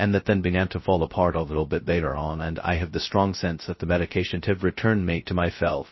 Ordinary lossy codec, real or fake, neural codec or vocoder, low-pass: MP3, 24 kbps; fake; codec, 16 kHz, 0.2 kbps, FocalCodec; 7.2 kHz